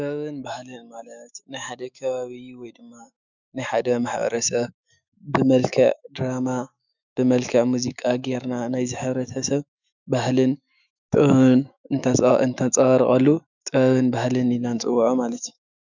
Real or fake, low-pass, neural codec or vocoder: fake; 7.2 kHz; autoencoder, 48 kHz, 128 numbers a frame, DAC-VAE, trained on Japanese speech